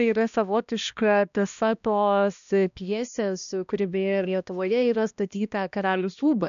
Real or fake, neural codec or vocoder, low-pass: fake; codec, 16 kHz, 1 kbps, X-Codec, HuBERT features, trained on balanced general audio; 7.2 kHz